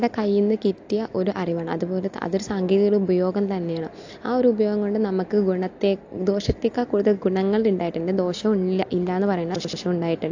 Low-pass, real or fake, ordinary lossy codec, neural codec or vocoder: 7.2 kHz; real; AAC, 48 kbps; none